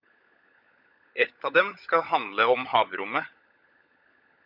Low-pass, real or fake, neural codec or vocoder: 5.4 kHz; fake; codec, 16 kHz, 16 kbps, FunCodec, trained on LibriTTS, 50 frames a second